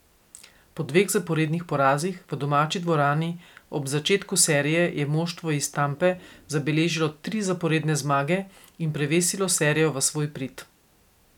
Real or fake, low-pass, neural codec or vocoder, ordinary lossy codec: real; 19.8 kHz; none; none